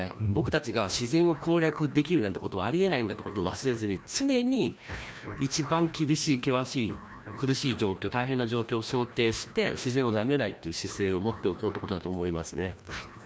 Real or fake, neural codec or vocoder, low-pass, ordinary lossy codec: fake; codec, 16 kHz, 1 kbps, FreqCodec, larger model; none; none